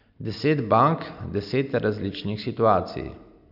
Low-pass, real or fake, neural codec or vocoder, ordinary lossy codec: 5.4 kHz; real; none; none